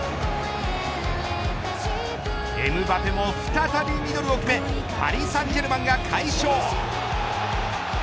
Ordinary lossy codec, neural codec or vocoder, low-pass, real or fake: none; none; none; real